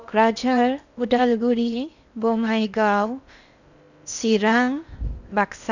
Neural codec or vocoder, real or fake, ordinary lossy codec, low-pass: codec, 16 kHz in and 24 kHz out, 0.6 kbps, FocalCodec, streaming, 4096 codes; fake; none; 7.2 kHz